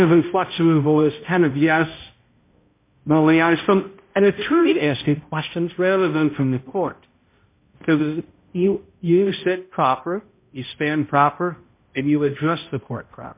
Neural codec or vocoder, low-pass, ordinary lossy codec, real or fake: codec, 16 kHz, 0.5 kbps, X-Codec, HuBERT features, trained on balanced general audio; 3.6 kHz; MP3, 24 kbps; fake